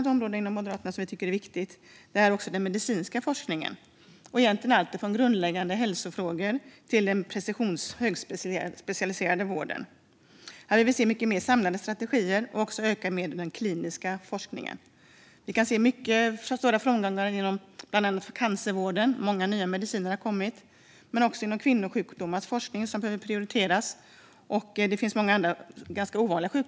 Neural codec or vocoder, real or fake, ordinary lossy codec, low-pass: none; real; none; none